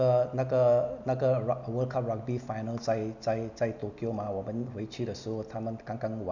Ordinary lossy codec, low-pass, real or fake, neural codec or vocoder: none; 7.2 kHz; real; none